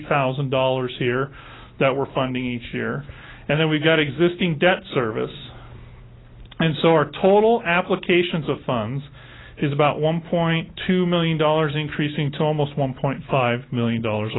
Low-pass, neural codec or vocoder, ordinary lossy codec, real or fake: 7.2 kHz; none; AAC, 16 kbps; real